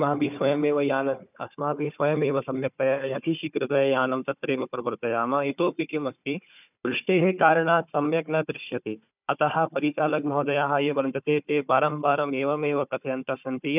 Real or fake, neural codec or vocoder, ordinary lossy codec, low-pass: fake; codec, 16 kHz, 4 kbps, FunCodec, trained on Chinese and English, 50 frames a second; none; 3.6 kHz